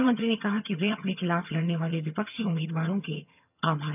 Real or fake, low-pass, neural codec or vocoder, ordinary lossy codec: fake; 3.6 kHz; vocoder, 22.05 kHz, 80 mel bands, HiFi-GAN; none